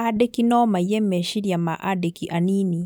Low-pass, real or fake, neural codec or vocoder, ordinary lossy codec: none; real; none; none